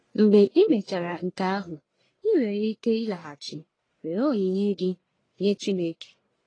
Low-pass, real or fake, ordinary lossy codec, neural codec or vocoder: 9.9 kHz; fake; AAC, 32 kbps; codec, 44.1 kHz, 1.7 kbps, Pupu-Codec